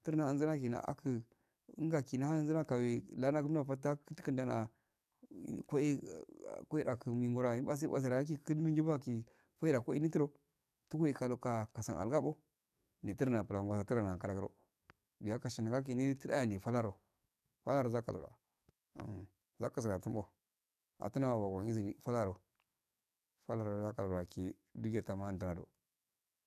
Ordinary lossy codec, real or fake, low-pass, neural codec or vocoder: none; fake; 14.4 kHz; codec, 44.1 kHz, 7.8 kbps, DAC